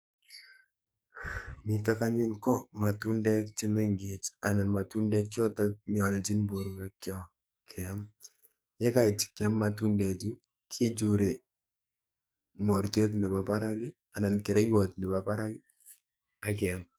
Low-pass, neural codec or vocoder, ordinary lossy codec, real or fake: none; codec, 44.1 kHz, 2.6 kbps, SNAC; none; fake